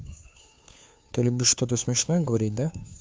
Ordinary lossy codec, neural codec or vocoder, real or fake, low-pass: none; codec, 16 kHz, 2 kbps, FunCodec, trained on Chinese and English, 25 frames a second; fake; none